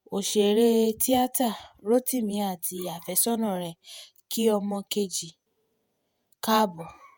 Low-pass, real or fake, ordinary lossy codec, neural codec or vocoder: none; fake; none; vocoder, 48 kHz, 128 mel bands, Vocos